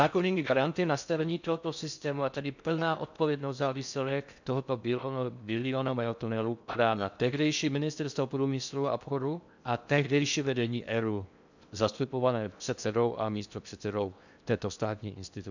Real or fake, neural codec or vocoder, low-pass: fake; codec, 16 kHz in and 24 kHz out, 0.6 kbps, FocalCodec, streaming, 4096 codes; 7.2 kHz